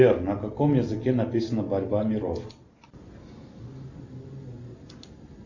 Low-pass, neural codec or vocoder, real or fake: 7.2 kHz; none; real